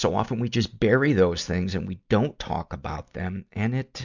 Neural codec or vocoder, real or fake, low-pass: none; real; 7.2 kHz